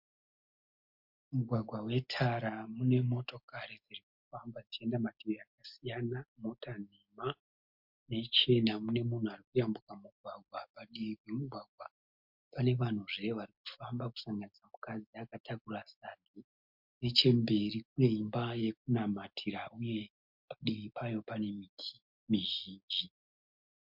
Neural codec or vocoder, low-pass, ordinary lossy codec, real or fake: none; 5.4 kHz; MP3, 48 kbps; real